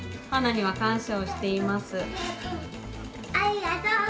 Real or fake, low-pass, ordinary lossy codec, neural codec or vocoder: real; none; none; none